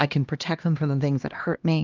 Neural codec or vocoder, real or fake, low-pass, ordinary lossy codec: codec, 16 kHz, 1 kbps, X-Codec, HuBERT features, trained on LibriSpeech; fake; 7.2 kHz; Opus, 24 kbps